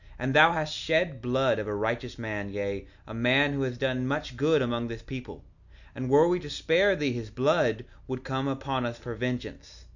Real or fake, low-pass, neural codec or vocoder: real; 7.2 kHz; none